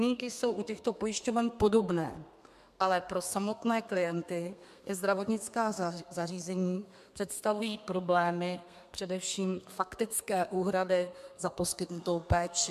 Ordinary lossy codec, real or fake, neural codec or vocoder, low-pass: MP3, 96 kbps; fake; codec, 32 kHz, 1.9 kbps, SNAC; 14.4 kHz